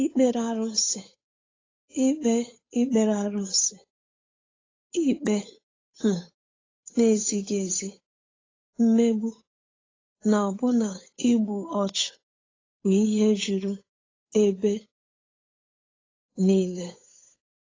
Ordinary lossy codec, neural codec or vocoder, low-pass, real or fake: AAC, 32 kbps; codec, 16 kHz, 8 kbps, FunCodec, trained on Chinese and English, 25 frames a second; 7.2 kHz; fake